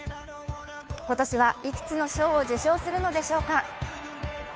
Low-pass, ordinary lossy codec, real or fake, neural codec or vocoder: none; none; fake; codec, 16 kHz, 2 kbps, FunCodec, trained on Chinese and English, 25 frames a second